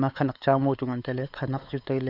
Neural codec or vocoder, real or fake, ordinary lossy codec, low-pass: codec, 16 kHz, 4 kbps, X-Codec, WavLM features, trained on Multilingual LibriSpeech; fake; Opus, 64 kbps; 5.4 kHz